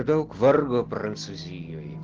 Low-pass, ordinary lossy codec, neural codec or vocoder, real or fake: 7.2 kHz; Opus, 16 kbps; none; real